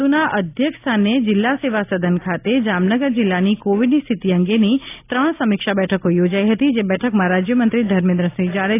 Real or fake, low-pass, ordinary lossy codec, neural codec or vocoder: real; 3.6 kHz; AAC, 24 kbps; none